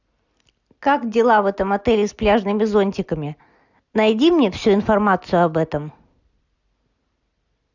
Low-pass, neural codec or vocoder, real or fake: 7.2 kHz; none; real